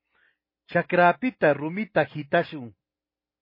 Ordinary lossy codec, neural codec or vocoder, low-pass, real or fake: MP3, 24 kbps; none; 5.4 kHz; real